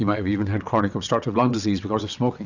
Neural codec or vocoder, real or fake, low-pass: vocoder, 22.05 kHz, 80 mel bands, WaveNeXt; fake; 7.2 kHz